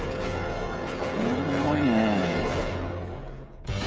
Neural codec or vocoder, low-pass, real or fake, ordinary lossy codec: codec, 16 kHz, 16 kbps, FreqCodec, smaller model; none; fake; none